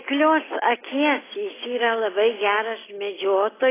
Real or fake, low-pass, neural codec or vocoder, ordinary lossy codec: real; 3.6 kHz; none; AAC, 16 kbps